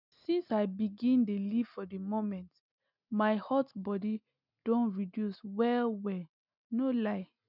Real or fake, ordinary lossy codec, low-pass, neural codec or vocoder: real; none; 5.4 kHz; none